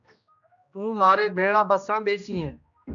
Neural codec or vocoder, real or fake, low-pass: codec, 16 kHz, 1 kbps, X-Codec, HuBERT features, trained on general audio; fake; 7.2 kHz